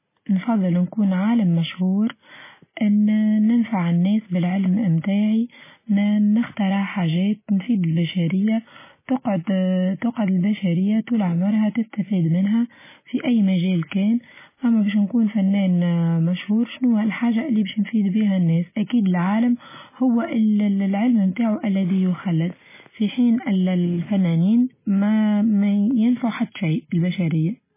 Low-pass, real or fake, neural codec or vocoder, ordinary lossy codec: 3.6 kHz; real; none; MP3, 16 kbps